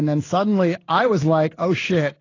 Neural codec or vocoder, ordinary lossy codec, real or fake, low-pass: codec, 16 kHz in and 24 kHz out, 1 kbps, XY-Tokenizer; AAC, 32 kbps; fake; 7.2 kHz